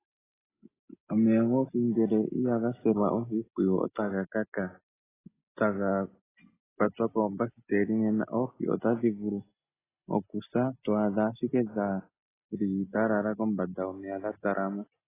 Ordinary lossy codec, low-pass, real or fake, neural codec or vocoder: AAC, 16 kbps; 3.6 kHz; real; none